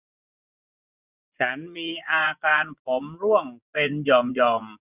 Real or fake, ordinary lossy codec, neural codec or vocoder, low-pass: fake; none; vocoder, 24 kHz, 100 mel bands, Vocos; 3.6 kHz